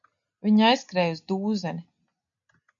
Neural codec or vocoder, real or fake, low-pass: none; real; 7.2 kHz